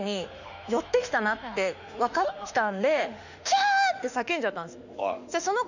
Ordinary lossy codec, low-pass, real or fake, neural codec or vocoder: none; 7.2 kHz; fake; autoencoder, 48 kHz, 32 numbers a frame, DAC-VAE, trained on Japanese speech